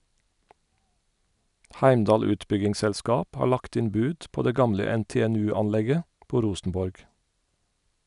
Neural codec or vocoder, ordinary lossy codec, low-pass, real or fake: none; none; 10.8 kHz; real